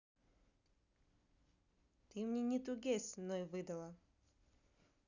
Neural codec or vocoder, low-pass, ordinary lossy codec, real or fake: none; 7.2 kHz; none; real